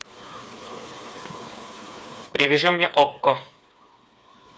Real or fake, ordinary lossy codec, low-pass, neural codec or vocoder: fake; none; none; codec, 16 kHz, 4 kbps, FreqCodec, smaller model